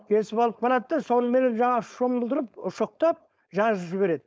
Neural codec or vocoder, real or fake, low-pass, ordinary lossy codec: codec, 16 kHz, 4.8 kbps, FACodec; fake; none; none